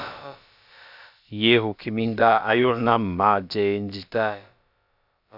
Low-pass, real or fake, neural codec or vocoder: 5.4 kHz; fake; codec, 16 kHz, about 1 kbps, DyCAST, with the encoder's durations